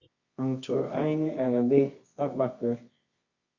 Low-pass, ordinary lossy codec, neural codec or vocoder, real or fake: 7.2 kHz; Opus, 64 kbps; codec, 24 kHz, 0.9 kbps, WavTokenizer, medium music audio release; fake